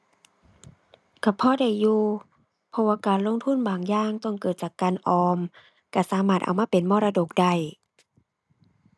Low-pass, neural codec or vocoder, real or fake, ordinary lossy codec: none; none; real; none